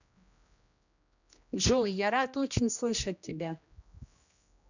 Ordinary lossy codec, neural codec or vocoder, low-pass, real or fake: none; codec, 16 kHz, 1 kbps, X-Codec, HuBERT features, trained on general audio; 7.2 kHz; fake